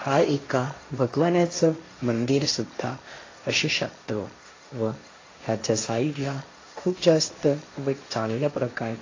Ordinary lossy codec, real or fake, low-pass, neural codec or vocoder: AAC, 32 kbps; fake; 7.2 kHz; codec, 16 kHz, 1.1 kbps, Voila-Tokenizer